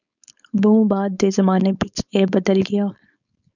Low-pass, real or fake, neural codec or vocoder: 7.2 kHz; fake; codec, 16 kHz, 4.8 kbps, FACodec